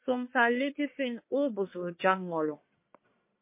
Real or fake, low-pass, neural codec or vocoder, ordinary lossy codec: fake; 3.6 kHz; codec, 44.1 kHz, 1.7 kbps, Pupu-Codec; MP3, 24 kbps